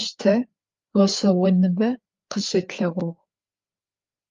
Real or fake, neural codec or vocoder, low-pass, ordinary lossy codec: fake; codec, 16 kHz, 4 kbps, FreqCodec, larger model; 7.2 kHz; Opus, 32 kbps